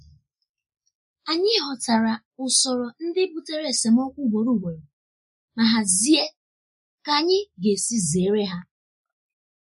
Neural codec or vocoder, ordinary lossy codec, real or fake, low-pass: none; MP3, 48 kbps; real; 14.4 kHz